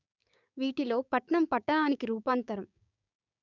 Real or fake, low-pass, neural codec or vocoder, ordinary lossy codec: fake; 7.2 kHz; codec, 16 kHz, 6 kbps, DAC; none